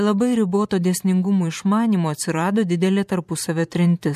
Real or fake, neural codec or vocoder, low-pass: fake; vocoder, 44.1 kHz, 128 mel bands every 512 samples, BigVGAN v2; 14.4 kHz